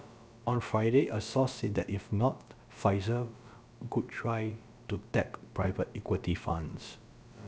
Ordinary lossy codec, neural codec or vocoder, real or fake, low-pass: none; codec, 16 kHz, about 1 kbps, DyCAST, with the encoder's durations; fake; none